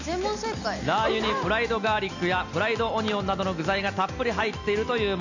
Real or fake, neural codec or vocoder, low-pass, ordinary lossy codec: real; none; 7.2 kHz; none